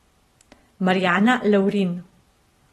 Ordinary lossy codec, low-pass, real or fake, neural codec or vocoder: AAC, 32 kbps; 19.8 kHz; real; none